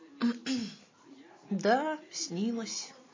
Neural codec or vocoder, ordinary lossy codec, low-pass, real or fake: none; MP3, 32 kbps; 7.2 kHz; real